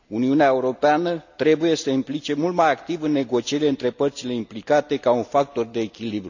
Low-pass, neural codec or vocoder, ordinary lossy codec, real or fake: 7.2 kHz; none; none; real